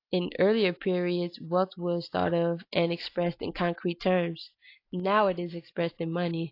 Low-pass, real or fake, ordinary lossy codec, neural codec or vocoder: 5.4 kHz; real; AAC, 32 kbps; none